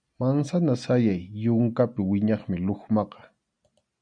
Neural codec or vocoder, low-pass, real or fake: none; 9.9 kHz; real